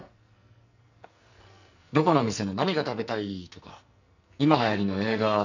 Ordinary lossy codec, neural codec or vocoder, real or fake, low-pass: none; codec, 44.1 kHz, 2.6 kbps, SNAC; fake; 7.2 kHz